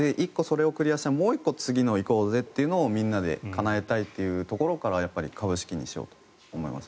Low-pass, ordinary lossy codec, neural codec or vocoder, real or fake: none; none; none; real